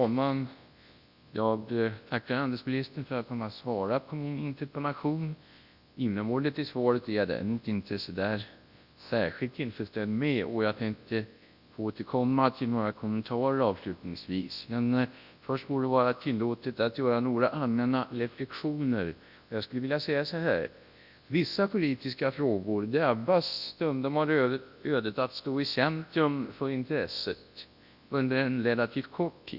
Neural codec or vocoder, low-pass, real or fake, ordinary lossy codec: codec, 24 kHz, 0.9 kbps, WavTokenizer, large speech release; 5.4 kHz; fake; Opus, 64 kbps